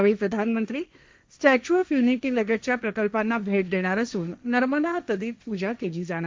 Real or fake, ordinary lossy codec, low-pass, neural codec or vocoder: fake; none; none; codec, 16 kHz, 1.1 kbps, Voila-Tokenizer